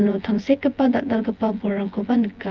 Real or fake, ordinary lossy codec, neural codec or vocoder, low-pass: fake; Opus, 24 kbps; vocoder, 24 kHz, 100 mel bands, Vocos; 7.2 kHz